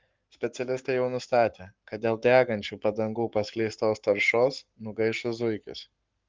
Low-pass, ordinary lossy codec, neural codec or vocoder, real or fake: 7.2 kHz; Opus, 24 kbps; codec, 44.1 kHz, 7.8 kbps, Pupu-Codec; fake